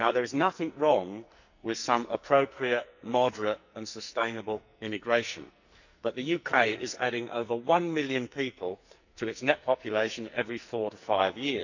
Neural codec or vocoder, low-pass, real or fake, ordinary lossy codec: codec, 44.1 kHz, 2.6 kbps, SNAC; 7.2 kHz; fake; none